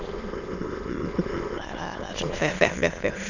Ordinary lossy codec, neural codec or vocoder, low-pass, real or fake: none; autoencoder, 22.05 kHz, a latent of 192 numbers a frame, VITS, trained on many speakers; 7.2 kHz; fake